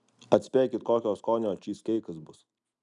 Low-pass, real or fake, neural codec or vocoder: 10.8 kHz; real; none